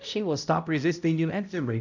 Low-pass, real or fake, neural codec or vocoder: 7.2 kHz; fake; codec, 16 kHz, 0.5 kbps, X-Codec, HuBERT features, trained on balanced general audio